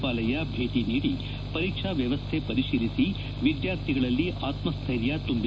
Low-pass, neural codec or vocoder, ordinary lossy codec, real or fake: none; none; none; real